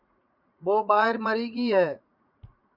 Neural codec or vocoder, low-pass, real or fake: vocoder, 44.1 kHz, 80 mel bands, Vocos; 5.4 kHz; fake